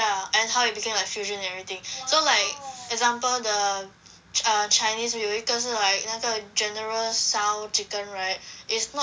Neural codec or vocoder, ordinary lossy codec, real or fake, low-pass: none; none; real; none